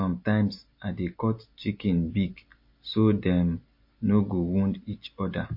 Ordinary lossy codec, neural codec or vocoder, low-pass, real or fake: MP3, 32 kbps; none; 5.4 kHz; real